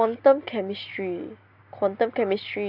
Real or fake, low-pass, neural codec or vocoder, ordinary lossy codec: real; 5.4 kHz; none; AAC, 48 kbps